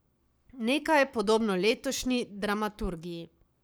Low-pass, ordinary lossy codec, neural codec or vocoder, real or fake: none; none; codec, 44.1 kHz, 7.8 kbps, Pupu-Codec; fake